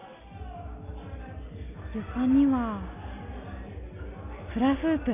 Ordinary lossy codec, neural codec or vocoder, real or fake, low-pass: none; none; real; 3.6 kHz